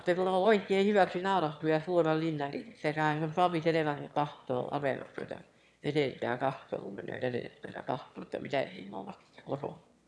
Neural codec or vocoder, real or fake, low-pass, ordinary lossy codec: autoencoder, 22.05 kHz, a latent of 192 numbers a frame, VITS, trained on one speaker; fake; none; none